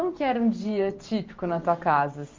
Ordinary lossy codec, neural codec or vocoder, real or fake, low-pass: Opus, 24 kbps; vocoder, 44.1 kHz, 128 mel bands every 512 samples, BigVGAN v2; fake; 7.2 kHz